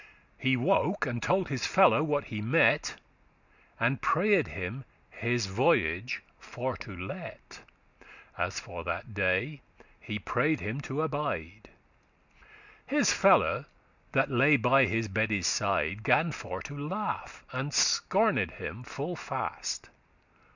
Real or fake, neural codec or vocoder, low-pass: real; none; 7.2 kHz